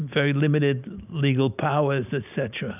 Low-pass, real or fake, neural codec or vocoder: 3.6 kHz; real; none